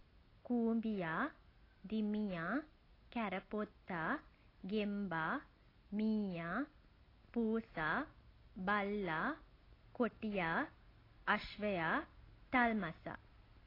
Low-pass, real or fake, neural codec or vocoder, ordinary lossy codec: 5.4 kHz; real; none; AAC, 24 kbps